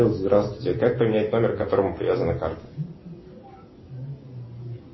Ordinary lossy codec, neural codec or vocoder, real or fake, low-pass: MP3, 24 kbps; none; real; 7.2 kHz